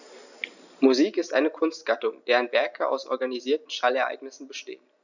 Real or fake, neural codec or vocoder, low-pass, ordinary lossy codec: real; none; 7.2 kHz; none